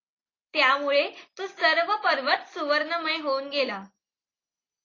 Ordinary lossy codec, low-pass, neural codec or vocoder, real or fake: AAC, 32 kbps; 7.2 kHz; none; real